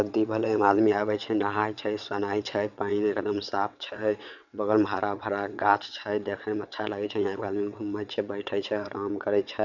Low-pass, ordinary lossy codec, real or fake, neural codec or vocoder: 7.2 kHz; none; fake; vocoder, 22.05 kHz, 80 mel bands, WaveNeXt